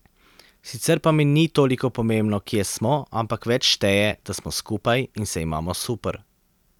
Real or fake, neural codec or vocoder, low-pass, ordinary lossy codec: real; none; 19.8 kHz; none